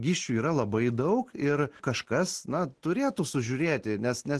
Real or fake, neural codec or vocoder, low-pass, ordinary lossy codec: real; none; 10.8 kHz; Opus, 16 kbps